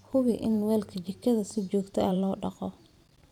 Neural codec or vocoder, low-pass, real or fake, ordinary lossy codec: vocoder, 44.1 kHz, 128 mel bands every 512 samples, BigVGAN v2; 19.8 kHz; fake; none